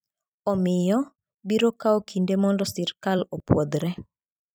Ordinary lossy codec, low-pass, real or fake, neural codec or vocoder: none; none; fake; vocoder, 44.1 kHz, 128 mel bands every 256 samples, BigVGAN v2